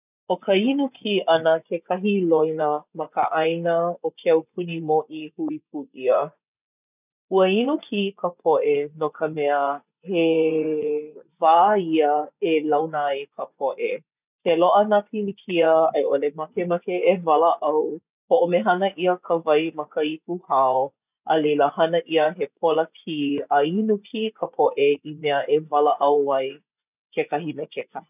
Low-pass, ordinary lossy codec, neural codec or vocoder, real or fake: 3.6 kHz; none; vocoder, 24 kHz, 100 mel bands, Vocos; fake